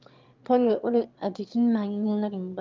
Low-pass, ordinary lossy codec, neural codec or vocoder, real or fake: 7.2 kHz; Opus, 32 kbps; autoencoder, 22.05 kHz, a latent of 192 numbers a frame, VITS, trained on one speaker; fake